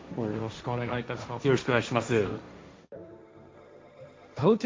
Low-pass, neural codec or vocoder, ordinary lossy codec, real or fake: none; codec, 16 kHz, 1.1 kbps, Voila-Tokenizer; none; fake